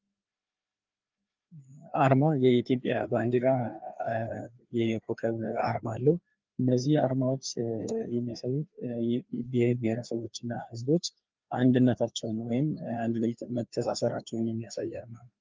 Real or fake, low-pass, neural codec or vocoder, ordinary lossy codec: fake; 7.2 kHz; codec, 16 kHz, 2 kbps, FreqCodec, larger model; Opus, 32 kbps